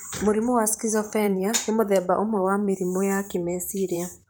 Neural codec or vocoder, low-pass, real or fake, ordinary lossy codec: vocoder, 44.1 kHz, 128 mel bands, Pupu-Vocoder; none; fake; none